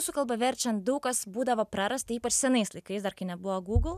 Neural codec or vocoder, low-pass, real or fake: none; 14.4 kHz; real